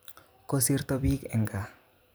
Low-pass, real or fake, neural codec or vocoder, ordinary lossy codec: none; fake; vocoder, 44.1 kHz, 128 mel bands every 256 samples, BigVGAN v2; none